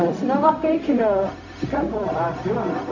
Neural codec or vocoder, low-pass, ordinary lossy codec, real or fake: codec, 16 kHz, 0.4 kbps, LongCat-Audio-Codec; 7.2 kHz; none; fake